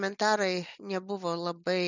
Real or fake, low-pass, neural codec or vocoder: real; 7.2 kHz; none